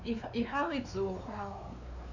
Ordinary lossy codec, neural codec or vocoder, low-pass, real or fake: none; codec, 16 kHz, 4 kbps, X-Codec, WavLM features, trained on Multilingual LibriSpeech; 7.2 kHz; fake